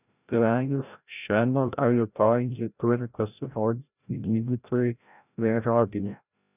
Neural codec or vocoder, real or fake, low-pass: codec, 16 kHz, 0.5 kbps, FreqCodec, larger model; fake; 3.6 kHz